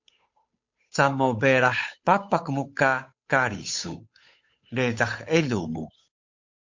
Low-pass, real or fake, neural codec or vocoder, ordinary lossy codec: 7.2 kHz; fake; codec, 16 kHz, 8 kbps, FunCodec, trained on Chinese and English, 25 frames a second; MP3, 48 kbps